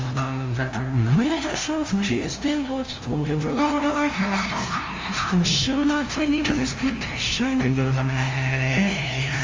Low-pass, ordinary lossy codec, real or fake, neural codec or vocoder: 7.2 kHz; Opus, 32 kbps; fake; codec, 16 kHz, 0.5 kbps, FunCodec, trained on LibriTTS, 25 frames a second